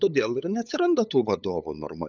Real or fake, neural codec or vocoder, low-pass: fake; codec, 16 kHz, 8 kbps, FunCodec, trained on LibriTTS, 25 frames a second; 7.2 kHz